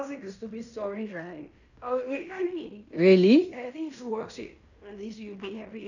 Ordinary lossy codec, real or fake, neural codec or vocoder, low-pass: none; fake; codec, 16 kHz in and 24 kHz out, 0.9 kbps, LongCat-Audio-Codec, fine tuned four codebook decoder; 7.2 kHz